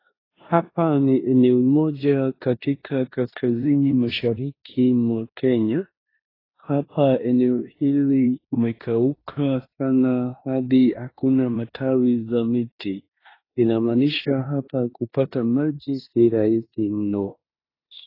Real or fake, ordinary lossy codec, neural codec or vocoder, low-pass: fake; AAC, 24 kbps; codec, 16 kHz in and 24 kHz out, 0.9 kbps, LongCat-Audio-Codec, four codebook decoder; 5.4 kHz